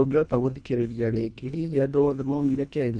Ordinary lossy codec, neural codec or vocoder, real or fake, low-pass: none; codec, 24 kHz, 1.5 kbps, HILCodec; fake; 9.9 kHz